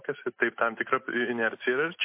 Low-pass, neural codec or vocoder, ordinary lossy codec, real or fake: 3.6 kHz; none; MP3, 24 kbps; real